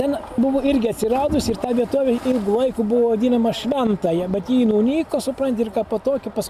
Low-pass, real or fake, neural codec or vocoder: 14.4 kHz; real; none